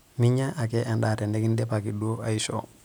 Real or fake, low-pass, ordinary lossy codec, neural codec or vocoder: real; none; none; none